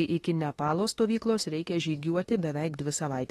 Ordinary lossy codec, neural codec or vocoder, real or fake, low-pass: AAC, 32 kbps; autoencoder, 48 kHz, 32 numbers a frame, DAC-VAE, trained on Japanese speech; fake; 19.8 kHz